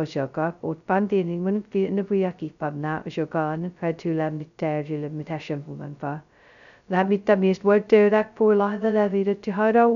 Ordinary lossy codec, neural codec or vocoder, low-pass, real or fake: none; codec, 16 kHz, 0.2 kbps, FocalCodec; 7.2 kHz; fake